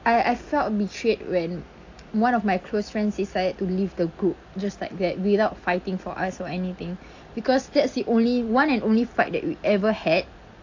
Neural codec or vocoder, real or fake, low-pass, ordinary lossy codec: none; real; 7.2 kHz; AAC, 48 kbps